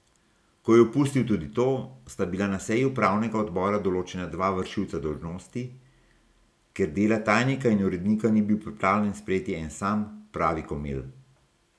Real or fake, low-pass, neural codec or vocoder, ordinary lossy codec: real; none; none; none